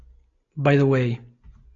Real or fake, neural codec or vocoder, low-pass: real; none; 7.2 kHz